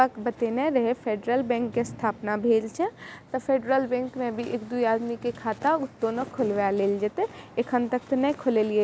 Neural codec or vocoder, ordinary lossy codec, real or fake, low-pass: none; none; real; none